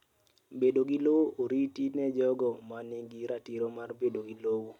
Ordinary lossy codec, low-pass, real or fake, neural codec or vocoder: MP3, 96 kbps; 19.8 kHz; real; none